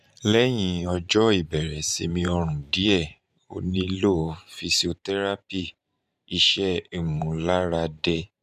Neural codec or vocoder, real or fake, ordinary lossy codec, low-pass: vocoder, 44.1 kHz, 128 mel bands every 512 samples, BigVGAN v2; fake; none; 14.4 kHz